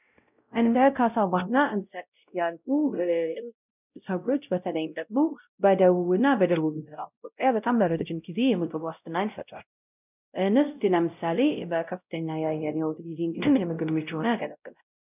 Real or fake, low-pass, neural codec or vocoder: fake; 3.6 kHz; codec, 16 kHz, 0.5 kbps, X-Codec, WavLM features, trained on Multilingual LibriSpeech